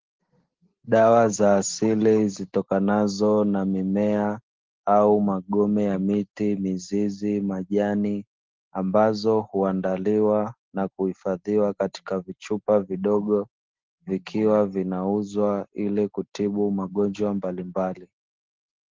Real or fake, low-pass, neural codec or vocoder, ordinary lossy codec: real; 7.2 kHz; none; Opus, 16 kbps